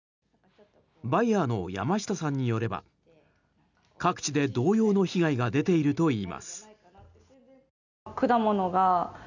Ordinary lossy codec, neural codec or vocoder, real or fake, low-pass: none; none; real; 7.2 kHz